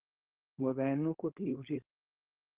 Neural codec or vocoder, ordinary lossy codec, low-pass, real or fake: codec, 16 kHz, 4.8 kbps, FACodec; Opus, 16 kbps; 3.6 kHz; fake